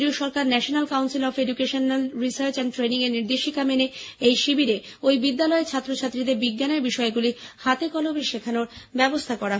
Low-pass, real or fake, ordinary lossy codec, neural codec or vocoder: none; real; none; none